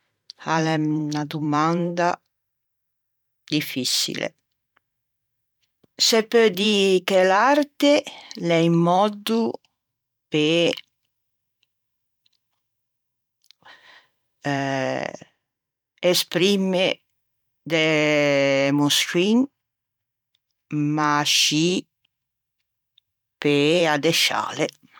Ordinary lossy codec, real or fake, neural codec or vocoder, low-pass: none; fake; vocoder, 44.1 kHz, 128 mel bands every 512 samples, BigVGAN v2; 19.8 kHz